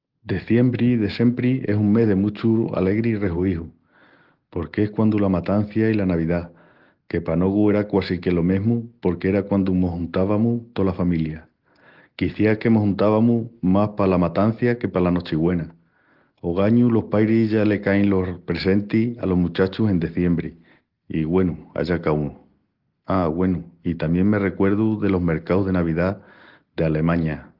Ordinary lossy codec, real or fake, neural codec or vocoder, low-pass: Opus, 16 kbps; real; none; 5.4 kHz